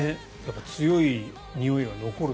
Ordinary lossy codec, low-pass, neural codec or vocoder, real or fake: none; none; none; real